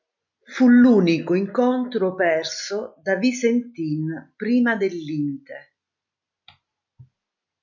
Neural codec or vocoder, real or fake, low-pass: none; real; 7.2 kHz